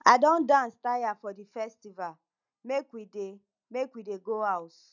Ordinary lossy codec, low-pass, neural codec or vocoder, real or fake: none; 7.2 kHz; none; real